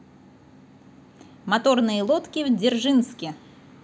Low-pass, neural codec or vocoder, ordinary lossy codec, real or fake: none; none; none; real